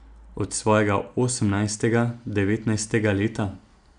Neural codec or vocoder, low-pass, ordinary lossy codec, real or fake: none; 9.9 kHz; none; real